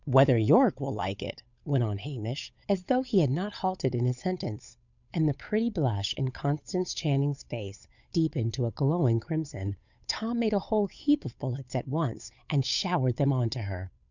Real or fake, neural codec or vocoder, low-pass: fake; codec, 16 kHz, 8 kbps, FunCodec, trained on Chinese and English, 25 frames a second; 7.2 kHz